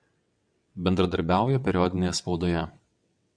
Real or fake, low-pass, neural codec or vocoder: fake; 9.9 kHz; vocoder, 22.05 kHz, 80 mel bands, WaveNeXt